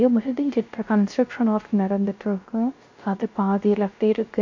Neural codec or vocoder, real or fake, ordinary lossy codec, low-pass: codec, 16 kHz, 0.3 kbps, FocalCodec; fake; MP3, 48 kbps; 7.2 kHz